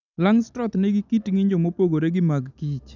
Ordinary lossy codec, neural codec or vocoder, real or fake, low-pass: none; none; real; 7.2 kHz